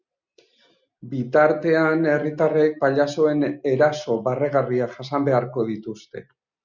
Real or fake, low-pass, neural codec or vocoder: real; 7.2 kHz; none